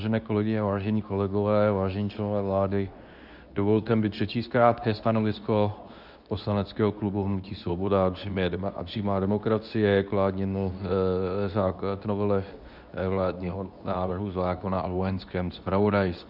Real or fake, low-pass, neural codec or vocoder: fake; 5.4 kHz; codec, 24 kHz, 0.9 kbps, WavTokenizer, medium speech release version 2